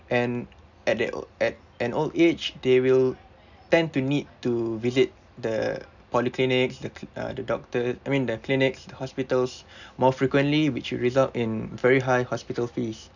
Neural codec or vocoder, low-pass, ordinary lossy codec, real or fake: none; 7.2 kHz; none; real